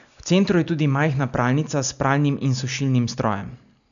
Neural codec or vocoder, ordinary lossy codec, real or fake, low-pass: none; none; real; 7.2 kHz